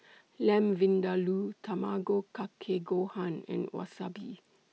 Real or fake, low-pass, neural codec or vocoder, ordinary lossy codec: real; none; none; none